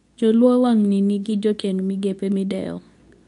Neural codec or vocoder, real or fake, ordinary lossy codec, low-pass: codec, 24 kHz, 0.9 kbps, WavTokenizer, medium speech release version 2; fake; none; 10.8 kHz